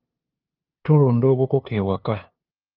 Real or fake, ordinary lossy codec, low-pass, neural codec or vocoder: fake; Opus, 24 kbps; 5.4 kHz; codec, 16 kHz, 2 kbps, FunCodec, trained on LibriTTS, 25 frames a second